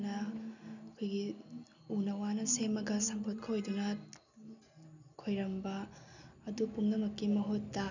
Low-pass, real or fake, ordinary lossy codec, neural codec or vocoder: 7.2 kHz; real; none; none